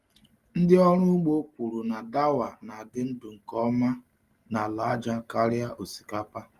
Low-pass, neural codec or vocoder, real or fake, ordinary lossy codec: 14.4 kHz; none; real; Opus, 32 kbps